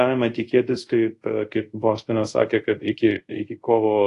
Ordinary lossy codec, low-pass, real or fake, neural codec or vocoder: AAC, 48 kbps; 10.8 kHz; fake; codec, 24 kHz, 0.5 kbps, DualCodec